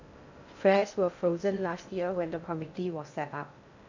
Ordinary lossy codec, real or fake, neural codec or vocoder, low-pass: none; fake; codec, 16 kHz in and 24 kHz out, 0.6 kbps, FocalCodec, streaming, 2048 codes; 7.2 kHz